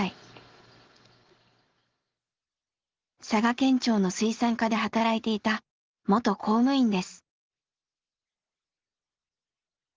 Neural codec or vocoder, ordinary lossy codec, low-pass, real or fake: none; Opus, 16 kbps; 7.2 kHz; real